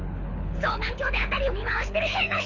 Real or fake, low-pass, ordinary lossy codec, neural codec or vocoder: fake; 7.2 kHz; none; codec, 24 kHz, 6 kbps, HILCodec